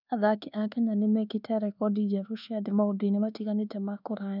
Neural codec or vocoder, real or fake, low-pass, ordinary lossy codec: codec, 24 kHz, 1.2 kbps, DualCodec; fake; 5.4 kHz; none